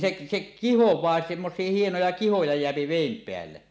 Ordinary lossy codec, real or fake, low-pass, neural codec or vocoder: none; real; none; none